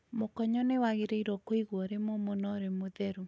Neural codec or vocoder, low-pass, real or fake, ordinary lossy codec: none; none; real; none